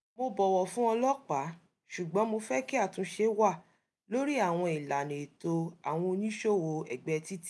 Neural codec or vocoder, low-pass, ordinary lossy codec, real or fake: none; none; none; real